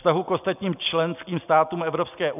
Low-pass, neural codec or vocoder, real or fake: 3.6 kHz; none; real